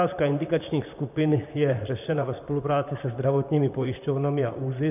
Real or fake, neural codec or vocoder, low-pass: fake; vocoder, 44.1 kHz, 128 mel bands, Pupu-Vocoder; 3.6 kHz